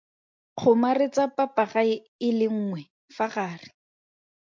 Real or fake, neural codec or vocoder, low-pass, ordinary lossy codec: real; none; 7.2 kHz; MP3, 64 kbps